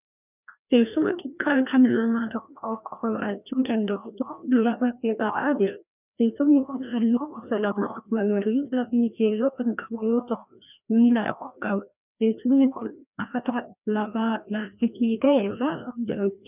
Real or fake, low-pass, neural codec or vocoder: fake; 3.6 kHz; codec, 16 kHz, 1 kbps, FreqCodec, larger model